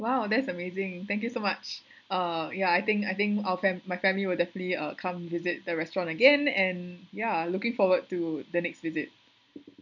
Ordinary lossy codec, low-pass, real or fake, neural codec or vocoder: none; 7.2 kHz; real; none